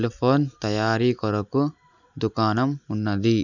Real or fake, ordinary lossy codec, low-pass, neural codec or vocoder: real; none; 7.2 kHz; none